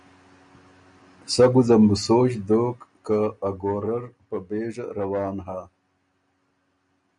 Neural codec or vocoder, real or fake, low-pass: none; real; 9.9 kHz